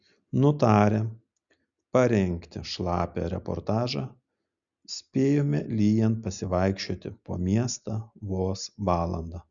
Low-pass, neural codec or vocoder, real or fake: 7.2 kHz; none; real